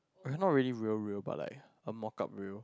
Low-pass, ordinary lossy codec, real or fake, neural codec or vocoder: none; none; real; none